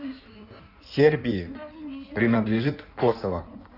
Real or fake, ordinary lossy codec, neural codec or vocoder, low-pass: fake; none; codec, 16 kHz in and 24 kHz out, 1.1 kbps, FireRedTTS-2 codec; 5.4 kHz